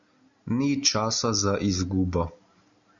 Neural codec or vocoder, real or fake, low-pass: none; real; 7.2 kHz